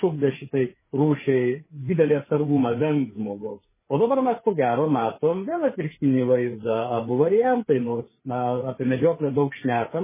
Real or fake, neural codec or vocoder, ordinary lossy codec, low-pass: fake; codec, 16 kHz, 4 kbps, FreqCodec, larger model; MP3, 16 kbps; 3.6 kHz